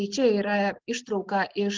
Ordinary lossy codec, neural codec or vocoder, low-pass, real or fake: Opus, 16 kbps; none; 7.2 kHz; real